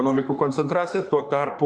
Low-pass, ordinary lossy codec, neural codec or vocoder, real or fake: 9.9 kHz; Opus, 64 kbps; autoencoder, 48 kHz, 32 numbers a frame, DAC-VAE, trained on Japanese speech; fake